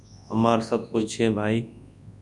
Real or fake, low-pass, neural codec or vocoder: fake; 10.8 kHz; codec, 24 kHz, 0.9 kbps, WavTokenizer, large speech release